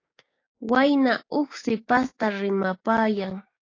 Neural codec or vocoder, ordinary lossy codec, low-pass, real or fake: codec, 16 kHz, 6 kbps, DAC; AAC, 32 kbps; 7.2 kHz; fake